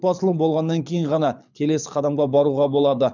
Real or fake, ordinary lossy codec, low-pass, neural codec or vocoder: fake; none; 7.2 kHz; codec, 24 kHz, 6 kbps, HILCodec